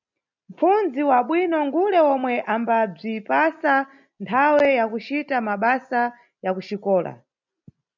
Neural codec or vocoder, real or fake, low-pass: none; real; 7.2 kHz